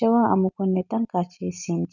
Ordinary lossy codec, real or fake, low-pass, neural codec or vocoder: none; real; 7.2 kHz; none